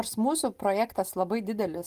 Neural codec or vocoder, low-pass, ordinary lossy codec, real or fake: none; 14.4 kHz; Opus, 24 kbps; real